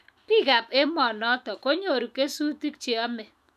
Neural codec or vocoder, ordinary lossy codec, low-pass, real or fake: autoencoder, 48 kHz, 128 numbers a frame, DAC-VAE, trained on Japanese speech; none; 14.4 kHz; fake